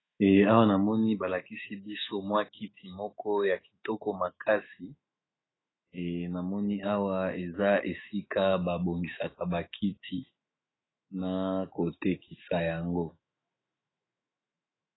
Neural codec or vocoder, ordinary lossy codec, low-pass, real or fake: autoencoder, 48 kHz, 128 numbers a frame, DAC-VAE, trained on Japanese speech; AAC, 16 kbps; 7.2 kHz; fake